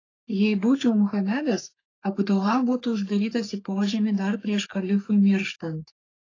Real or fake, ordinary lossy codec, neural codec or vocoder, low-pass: fake; AAC, 32 kbps; codec, 44.1 kHz, 3.4 kbps, Pupu-Codec; 7.2 kHz